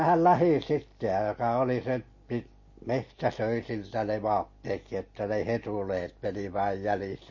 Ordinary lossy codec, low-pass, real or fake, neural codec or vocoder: MP3, 32 kbps; 7.2 kHz; real; none